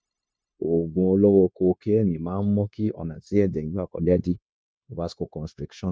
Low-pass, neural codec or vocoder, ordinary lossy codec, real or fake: none; codec, 16 kHz, 0.9 kbps, LongCat-Audio-Codec; none; fake